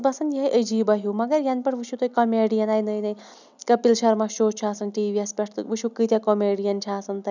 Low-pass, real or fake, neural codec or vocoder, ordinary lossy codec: 7.2 kHz; real; none; none